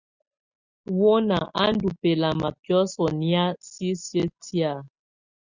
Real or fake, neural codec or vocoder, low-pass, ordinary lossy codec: real; none; 7.2 kHz; Opus, 64 kbps